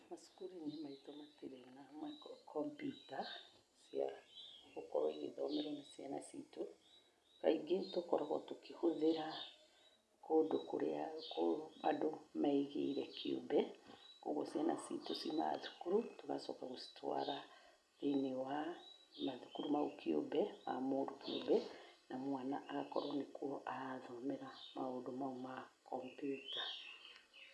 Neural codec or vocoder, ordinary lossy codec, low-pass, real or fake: none; none; none; real